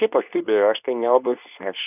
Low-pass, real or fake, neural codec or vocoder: 3.6 kHz; fake; codec, 24 kHz, 0.9 kbps, WavTokenizer, medium speech release version 2